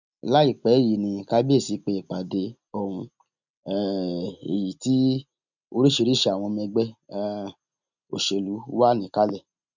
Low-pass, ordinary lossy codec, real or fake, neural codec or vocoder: 7.2 kHz; none; real; none